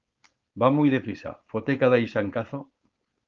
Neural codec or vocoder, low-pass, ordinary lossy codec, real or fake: codec, 16 kHz, 4.8 kbps, FACodec; 7.2 kHz; Opus, 32 kbps; fake